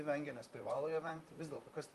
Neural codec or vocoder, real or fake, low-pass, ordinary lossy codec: vocoder, 44.1 kHz, 128 mel bands, Pupu-Vocoder; fake; 14.4 kHz; Opus, 32 kbps